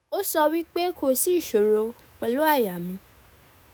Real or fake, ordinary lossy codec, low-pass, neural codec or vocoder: fake; none; none; autoencoder, 48 kHz, 128 numbers a frame, DAC-VAE, trained on Japanese speech